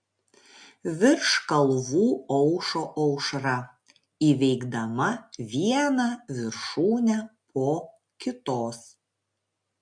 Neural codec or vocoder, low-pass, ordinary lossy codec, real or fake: none; 9.9 kHz; MP3, 64 kbps; real